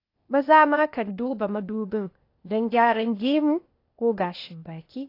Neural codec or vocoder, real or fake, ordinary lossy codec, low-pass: codec, 16 kHz, 0.8 kbps, ZipCodec; fake; AAC, 32 kbps; 5.4 kHz